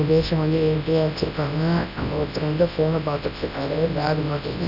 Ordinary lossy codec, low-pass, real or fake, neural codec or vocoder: none; 5.4 kHz; fake; codec, 24 kHz, 0.9 kbps, WavTokenizer, large speech release